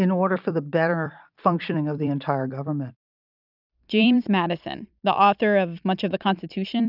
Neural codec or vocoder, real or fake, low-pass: vocoder, 44.1 kHz, 128 mel bands every 256 samples, BigVGAN v2; fake; 5.4 kHz